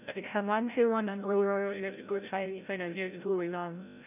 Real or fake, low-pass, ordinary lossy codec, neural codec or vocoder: fake; 3.6 kHz; none; codec, 16 kHz, 0.5 kbps, FreqCodec, larger model